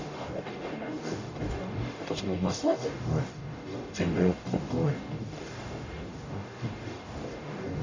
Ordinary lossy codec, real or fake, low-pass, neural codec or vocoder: Opus, 64 kbps; fake; 7.2 kHz; codec, 44.1 kHz, 0.9 kbps, DAC